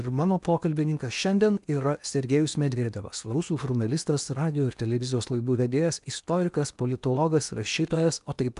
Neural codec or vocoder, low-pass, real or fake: codec, 16 kHz in and 24 kHz out, 0.8 kbps, FocalCodec, streaming, 65536 codes; 10.8 kHz; fake